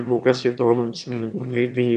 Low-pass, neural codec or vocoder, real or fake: 9.9 kHz; autoencoder, 22.05 kHz, a latent of 192 numbers a frame, VITS, trained on one speaker; fake